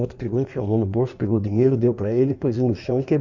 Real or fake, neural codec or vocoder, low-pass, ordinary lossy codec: fake; codec, 16 kHz in and 24 kHz out, 1.1 kbps, FireRedTTS-2 codec; 7.2 kHz; none